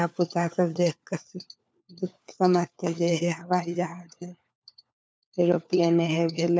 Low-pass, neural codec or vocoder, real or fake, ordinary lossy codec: none; codec, 16 kHz, 4 kbps, FunCodec, trained on LibriTTS, 50 frames a second; fake; none